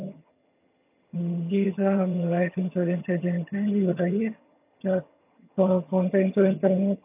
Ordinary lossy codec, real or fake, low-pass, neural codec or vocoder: AAC, 32 kbps; fake; 3.6 kHz; vocoder, 22.05 kHz, 80 mel bands, HiFi-GAN